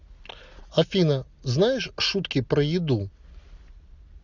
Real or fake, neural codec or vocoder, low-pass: real; none; 7.2 kHz